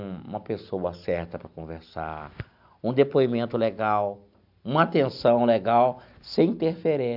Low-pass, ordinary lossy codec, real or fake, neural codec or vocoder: 5.4 kHz; AAC, 48 kbps; real; none